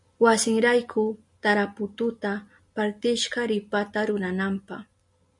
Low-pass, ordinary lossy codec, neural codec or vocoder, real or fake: 10.8 kHz; MP3, 64 kbps; none; real